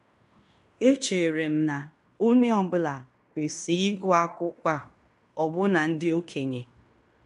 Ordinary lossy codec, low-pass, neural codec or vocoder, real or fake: none; 10.8 kHz; codec, 16 kHz in and 24 kHz out, 0.9 kbps, LongCat-Audio-Codec, fine tuned four codebook decoder; fake